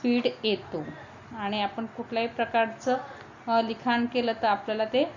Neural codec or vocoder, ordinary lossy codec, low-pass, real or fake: none; none; 7.2 kHz; real